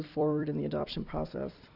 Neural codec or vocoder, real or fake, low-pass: vocoder, 44.1 kHz, 128 mel bands every 256 samples, BigVGAN v2; fake; 5.4 kHz